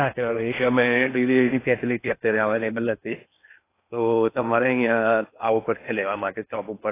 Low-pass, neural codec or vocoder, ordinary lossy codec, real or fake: 3.6 kHz; codec, 16 kHz in and 24 kHz out, 0.8 kbps, FocalCodec, streaming, 65536 codes; AAC, 24 kbps; fake